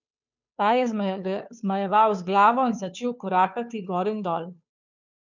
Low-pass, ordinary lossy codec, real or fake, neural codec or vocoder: 7.2 kHz; none; fake; codec, 16 kHz, 2 kbps, FunCodec, trained on Chinese and English, 25 frames a second